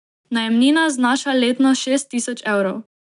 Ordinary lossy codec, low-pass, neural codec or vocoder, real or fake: none; 10.8 kHz; none; real